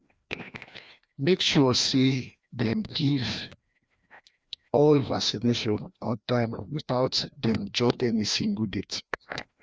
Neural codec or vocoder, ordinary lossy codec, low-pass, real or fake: codec, 16 kHz, 1 kbps, FreqCodec, larger model; none; none; fake